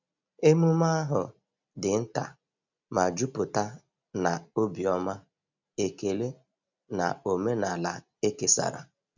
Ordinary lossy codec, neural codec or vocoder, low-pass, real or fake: none; none; 7.2 kHz; real